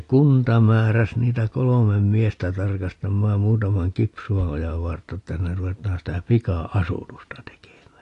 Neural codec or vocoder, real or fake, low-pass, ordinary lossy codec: none; real; 10.8 kHz; AAC, 48 kbps